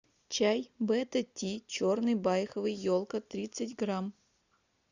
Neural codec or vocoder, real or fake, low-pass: none; real; 7.2 kHz